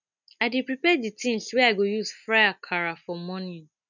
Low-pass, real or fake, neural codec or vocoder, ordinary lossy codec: 7.2 kHz; real; none; none